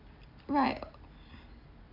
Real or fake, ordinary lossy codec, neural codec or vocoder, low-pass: real; none; none; 5.4 kHz